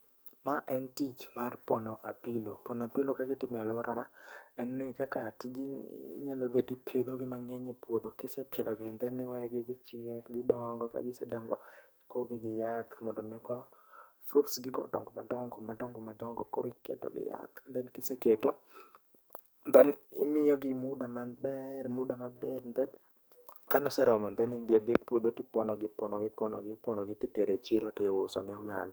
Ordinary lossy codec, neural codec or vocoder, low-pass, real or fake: none; codec, 44.1 kHz, 2.6 kbps, SNAC; none; fake